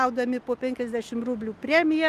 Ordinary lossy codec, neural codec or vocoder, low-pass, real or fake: Opus, 32 kbps; none; 14.4 kHz; real